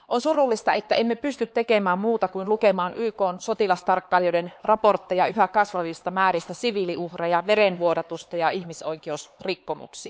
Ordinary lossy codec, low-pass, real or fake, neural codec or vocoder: none; none; fake; codec, 16 kHz, 4 kbps, X-Codec, HuBERT features, trained on LibriSpeech